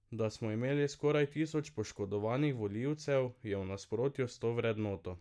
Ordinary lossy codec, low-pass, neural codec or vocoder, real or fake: none; none; none; real